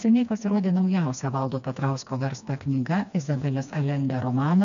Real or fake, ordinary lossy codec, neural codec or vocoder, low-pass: fake; MP3, 96 kbps; codec, 16 kHz, 2 kbps, FreqCodec, smaller model; 7.2 kHz